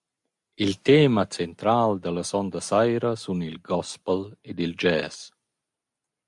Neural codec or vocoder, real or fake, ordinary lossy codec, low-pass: none; real; MP3, 64 kbps; 10.8 kHz